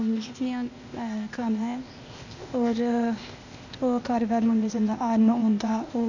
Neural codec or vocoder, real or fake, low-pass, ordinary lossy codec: codec, 16 kHz, 0.8 kbps, ZipCodec; fake; 7.2 kHz; none